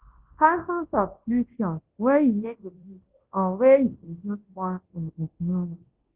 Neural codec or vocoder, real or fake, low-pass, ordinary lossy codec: codec, 24 kHz, 0.9 kbps, WavTokenizer, large speech release; fake; 3.6 kHz; Opus, 16 kbps